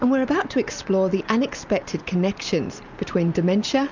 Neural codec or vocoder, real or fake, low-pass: none; real; 7.2 kHz